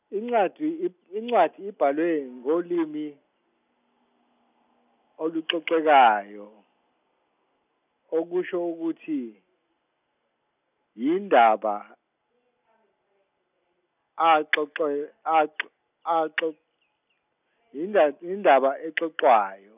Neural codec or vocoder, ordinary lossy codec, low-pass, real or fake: none; none; 3.6 kHz; real